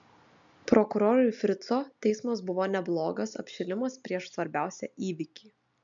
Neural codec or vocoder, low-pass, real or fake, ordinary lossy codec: none; 7.2 kHz; real; MP3, 64 kbps